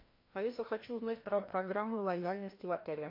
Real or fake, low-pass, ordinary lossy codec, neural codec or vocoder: fake; 5.4 kHz; MP3, 32 kbps; codec, 16 kHz, 1 kbps, FreqCodec, larger model